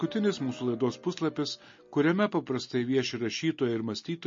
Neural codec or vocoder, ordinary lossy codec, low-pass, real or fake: none; MP3, 32 kbps; 7.2 kHz; real